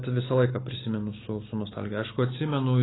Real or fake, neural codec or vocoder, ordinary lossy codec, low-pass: real; none; AAC, 16 kbps; 7.2 kHz